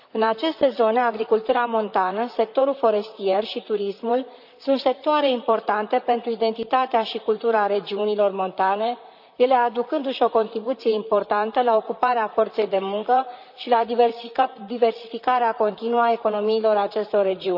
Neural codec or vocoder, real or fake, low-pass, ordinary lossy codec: vocoder, 44.1 kHz, 128 mel bands, Pupu-Vocoder; fake; 5.4 kHz; none